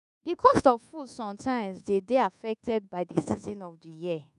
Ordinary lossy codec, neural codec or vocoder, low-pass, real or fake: none; codec, 24 kHz, 1.2 kbps, DualCodec; 10.8 kHz; fake